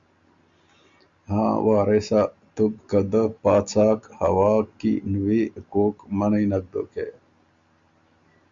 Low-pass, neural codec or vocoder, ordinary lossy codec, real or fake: 7.2 kHz; none; Opus, 64 kbps; real